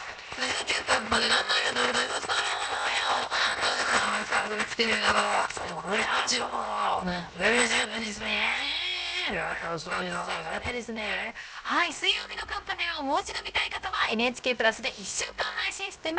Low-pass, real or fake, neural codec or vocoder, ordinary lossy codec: none; fake; codec, 16 kHz, 0.7 kbps, FocalCodec; none